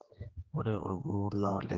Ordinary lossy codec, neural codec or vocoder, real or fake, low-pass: Opus, 16 kbps; codec, 16 kHz, 2 kbps, X-Codec, HuBERT features, trained on general audio; fake; 7.2 kHz